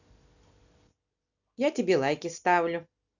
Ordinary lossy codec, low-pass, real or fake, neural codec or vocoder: none; 7.2 kHz; real; none